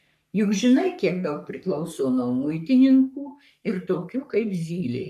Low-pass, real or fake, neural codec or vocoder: 14.4 kHz; fake; codec, 44.1 kHz, 3.4 kbps, Pupu-Codec